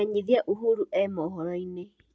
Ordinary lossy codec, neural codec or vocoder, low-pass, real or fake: none; none; none; real